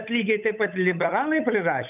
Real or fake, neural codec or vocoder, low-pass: fake; codec, 16 kHz, 8 kbps, FunCodec, trained on Chinese and English, 25 frames a second; 3.6 kHz